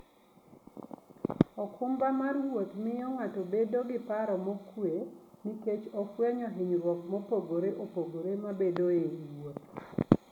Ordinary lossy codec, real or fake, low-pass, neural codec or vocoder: none; real; 19.8 kHz; none